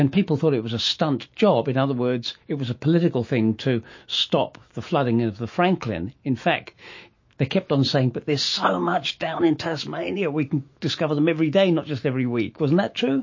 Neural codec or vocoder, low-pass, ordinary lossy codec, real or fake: autoencoder, 48 kHz, 128 numbers a frame, DAC-VAE, trained on Japanese speech; 7.2 kHz; MP3, 32 kbps; fake